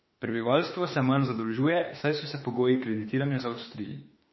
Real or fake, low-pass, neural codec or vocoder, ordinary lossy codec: fake; 7.2 kHz; autoencoder, 48 kHz, 32 numbers a frame, DAC-VAE, trained on Japanese speech; MP3, 24 kbps